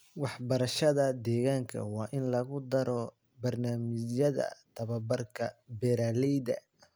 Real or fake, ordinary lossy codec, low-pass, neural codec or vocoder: real; none; none; none